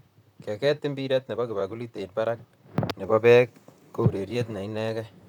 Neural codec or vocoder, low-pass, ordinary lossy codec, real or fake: vocoder, 44.1 kHz, 128 mel bands, Pupu-Vocoder; 19.8 kHz; none; fake